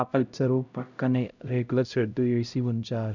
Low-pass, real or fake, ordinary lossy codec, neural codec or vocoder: 7.2 kHz; fake; none; codec, 16 kHz, 0.5 kbps, X-Codec, HuBERT features, trained on LibriSpeech